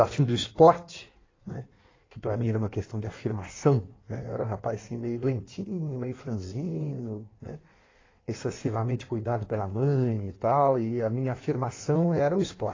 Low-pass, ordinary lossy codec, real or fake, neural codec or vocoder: 7.2 kHz; AAC, 32 kbps; fake; codec, 16 kHz in and 24 kHz out, 1.1 kbps, FireRedTTS-2 codec